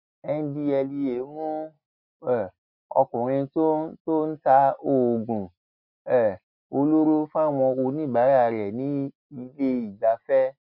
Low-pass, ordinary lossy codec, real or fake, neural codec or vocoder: 5.4 kHz; MP3, 48 kbps; real; none